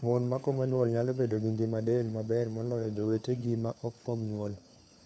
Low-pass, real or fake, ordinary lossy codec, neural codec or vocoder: none; fake; none; codec, 16 kHz, 4 kbps, FunCodec, trained on LibriTTS, 50 frames a second